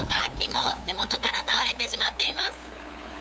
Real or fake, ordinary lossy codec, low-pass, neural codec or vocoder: fake; none; none; codec, 16 kHz, 2 kbps, FunCodec, trained on LibriTTS, 25 frames a second